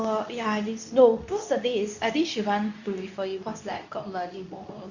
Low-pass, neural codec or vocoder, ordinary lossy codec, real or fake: 7.2 kHz; codec, 24 kHz, 0.9 kbps, WavTokenizer, medium speech release version 2; none; fake